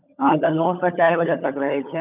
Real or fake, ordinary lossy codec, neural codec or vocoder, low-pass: fake; none; codec, 16 kHz, 16 kbps, FunCodec, trained on LibriTTS, 50 frames a second; 3.6 kHz